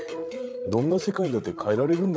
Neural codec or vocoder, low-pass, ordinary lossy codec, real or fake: codec, 16 kHz, 8 kbps, FreqCodec, larger model; none; none; fake